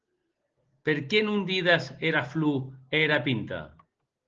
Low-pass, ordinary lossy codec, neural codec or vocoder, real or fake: 7.2 kHz; Opus, 16 kbps; none; real